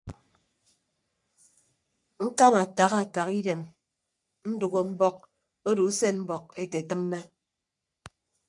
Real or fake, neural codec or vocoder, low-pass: fake; codec, 44.1 kHz, 3.4 kbps, Pupu-Codec; 10.8 kHz